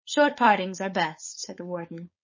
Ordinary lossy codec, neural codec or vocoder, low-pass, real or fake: MP3, 32 kbps; codec, 16 kHz, 4.8 kbps, FACodec; 7.2 kHz; fake